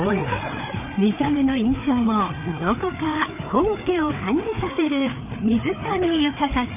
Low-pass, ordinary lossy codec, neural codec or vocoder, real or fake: 3.6 kHz; none; codec, 16 kHz, 4 kbps, FreqCodec, larger model; fake